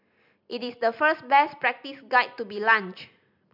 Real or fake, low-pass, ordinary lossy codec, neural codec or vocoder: real; 5.4 kHz; MP3, 48 kbps; none